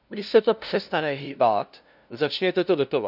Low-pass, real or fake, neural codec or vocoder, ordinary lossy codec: 5.4 kHz; fake; codec, 16 kHz, 0.5 kbps, FunCodec, trained on LibriTTS, 25 frames a second; none